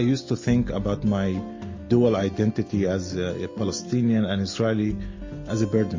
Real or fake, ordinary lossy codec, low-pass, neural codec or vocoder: real; MP3, 32 kbps; 7.2 kHz; none